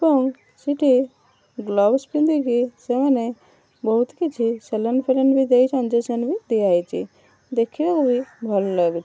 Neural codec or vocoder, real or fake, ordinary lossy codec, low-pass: none; real; none; none